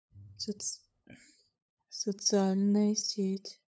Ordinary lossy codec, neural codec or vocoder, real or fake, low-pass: none; codec, 16 kHz, 8 kbps, FunCodec, trained on LibriTTS, 25 frames a second; fake; none